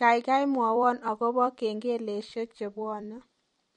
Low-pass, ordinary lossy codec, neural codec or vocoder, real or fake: 19.8 kHz; MP3, 48 kbps; vocoder, 44.1 kHz, 128 mel bands every 256 samples, BigVGAN v2; fake